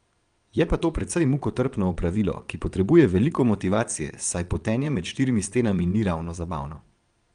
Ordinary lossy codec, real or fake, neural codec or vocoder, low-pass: Opus, 32 kbps; fake; vocoder, 22.05 kHz, 80 mel bands, Vocos; 9.9 kHz